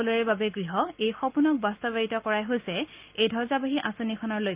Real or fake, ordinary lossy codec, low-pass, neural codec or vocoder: real; Opus, 24 kbps; 3.6 kHz; none